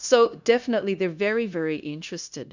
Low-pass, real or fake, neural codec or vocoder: 7.2 kHz; fake; codec, 16 kHz, 0.9 kbps, LongCat-Audio-Codec